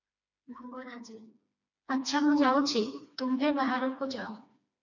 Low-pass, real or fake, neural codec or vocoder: 7.2 kHz; fake; codec, 16 kHz, 2 kbps, FreqCodec, smaller model